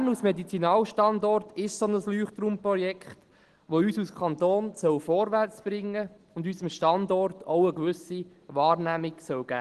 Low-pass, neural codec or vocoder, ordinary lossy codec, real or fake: 10.8 kHz; none; Opus, 16 kbps; real